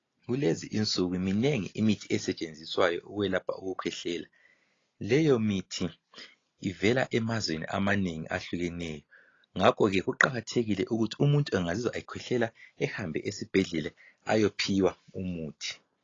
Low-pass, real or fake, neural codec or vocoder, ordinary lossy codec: 7.2 kHz; real; none; AAC, 32 kbps